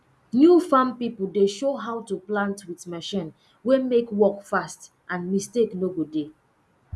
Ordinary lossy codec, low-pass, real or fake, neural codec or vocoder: none; none; real; none